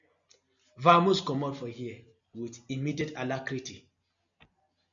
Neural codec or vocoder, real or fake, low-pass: none; real; 7.2 kHz